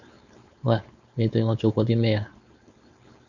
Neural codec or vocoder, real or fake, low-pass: codec, 16 kHz, 4.8 kbps, FACodec; fake; 7.2 kHz